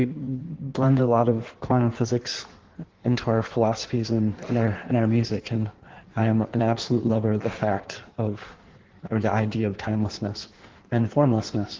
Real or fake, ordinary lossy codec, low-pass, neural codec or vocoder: fake; Opus, 16 kbps; 7.2 kHz; codec, 16 kHz in and 24 kHz out, 1.1 kbps, FireRedTTS-2 codec